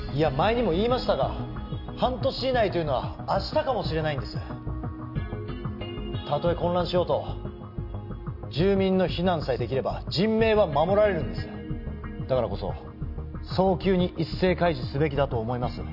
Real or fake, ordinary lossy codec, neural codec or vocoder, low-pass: real; none; none; 5.4 kHz